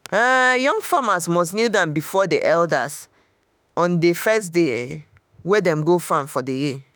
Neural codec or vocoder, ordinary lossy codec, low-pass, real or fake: autoencoder, 48 kHz, 32 numbers a frame, DAC-VAE, trained on Japanese speech; none; none; fake